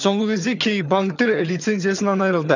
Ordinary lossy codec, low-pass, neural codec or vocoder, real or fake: none; 7.2 kHz; vocoder, 22.05 kHz, 80 mel bands, HiFi-GAN; fake